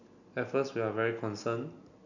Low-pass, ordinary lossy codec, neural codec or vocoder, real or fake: 7.2 kHz; none; none; real